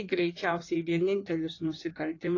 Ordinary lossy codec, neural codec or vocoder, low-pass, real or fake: AAC, 32 kbps; codec, 16 kHz in and 24 kHz out, 1.1 kbps, FireRedTTS-2 codec; 7.2 kHz; fake